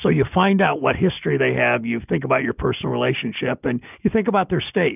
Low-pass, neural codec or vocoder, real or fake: 3.6 kHz; none; real